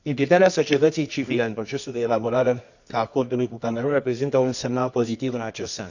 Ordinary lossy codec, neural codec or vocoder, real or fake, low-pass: none; codec, 24 kHz, 0.9 kbps, WavTokenizer, medium music audio release; fake; 7.2 kHz